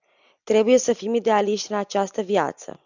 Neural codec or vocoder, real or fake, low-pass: none; real; 7.2 kHz